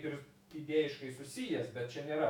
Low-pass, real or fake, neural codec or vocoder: 19.8 kHz; fake; autoencoder, 48 kHz, 128 numbers a frame, DAC-VAE, trained on Japanese speech